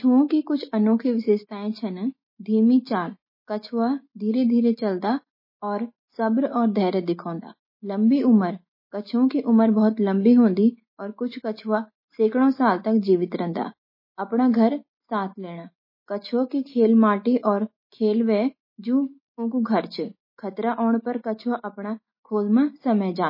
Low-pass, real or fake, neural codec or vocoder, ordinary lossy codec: 5.4 kHz; real; none; MP3, 24 kbps